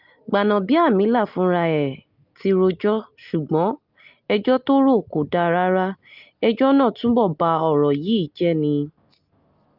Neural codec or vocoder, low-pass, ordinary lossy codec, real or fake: none; 5.4 kHz; Opus, 24 kbps; real